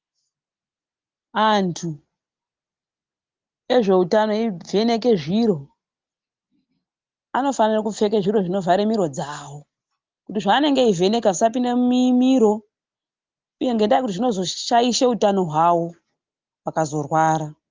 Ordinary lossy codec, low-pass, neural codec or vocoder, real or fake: Opus, 32 kbps; 7.2 kHz; none; real